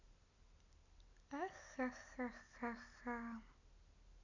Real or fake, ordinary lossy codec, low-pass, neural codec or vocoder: real; none; 7.2 kHz; none